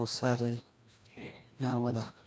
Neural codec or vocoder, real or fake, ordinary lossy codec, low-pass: codec, 16 kHz, 1 kbps, FreqCodec, larger model; fake; none; none